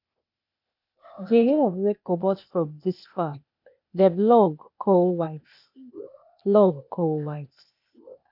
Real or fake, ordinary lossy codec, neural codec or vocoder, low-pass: fake; none; codec, 16 kHz, 0.8 kbps, ZipCodec; 5.4 kHz